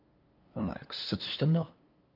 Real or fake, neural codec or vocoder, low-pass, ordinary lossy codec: fake; codec, 16 kHz, 2 kbps, FunCodec, trained on LibriTTS, 25 frames a second; 5.4 kHz; Opus, 24 kbps